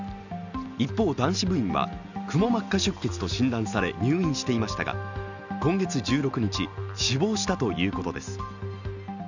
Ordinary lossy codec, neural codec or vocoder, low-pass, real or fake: none; none; 7.2 kHz; real